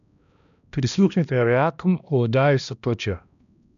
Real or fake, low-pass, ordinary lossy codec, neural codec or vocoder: fake; 7.2 kHz; none; codec, 16 kHz, 1 kbps, X-Codec, HuBERT features, trained on balanced general audio